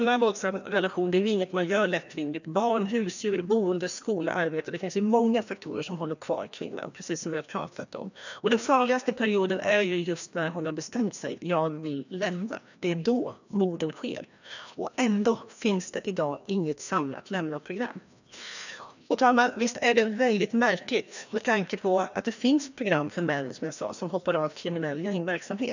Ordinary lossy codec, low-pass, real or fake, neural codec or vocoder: none; 7.2 kHz; fake; codec, 16 kHz, 1 kbps, FreqCodec, larger model